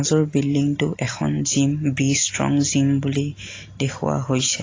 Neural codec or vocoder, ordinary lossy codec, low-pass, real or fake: none; AAC, 32 kbps; 7.2 kHz; real